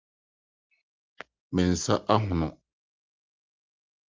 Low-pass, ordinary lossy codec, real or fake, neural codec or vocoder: 7.2 kHz; Opus, 32 kbps; real; none